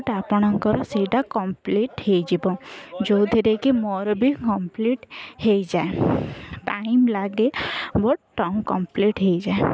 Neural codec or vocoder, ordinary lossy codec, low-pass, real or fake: none; none; none; real